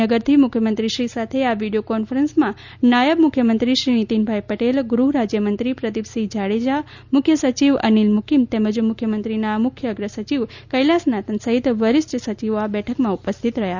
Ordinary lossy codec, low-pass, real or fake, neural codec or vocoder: Opus, 64 kbps; 7.2 kHz; real; none